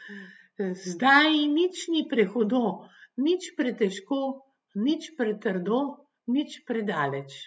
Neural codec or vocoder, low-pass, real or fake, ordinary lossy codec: none; none; real; none